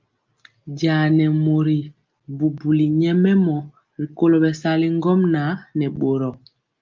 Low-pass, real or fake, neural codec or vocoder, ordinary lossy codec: 7.2 kHz; real; none; Opus, 24 kbps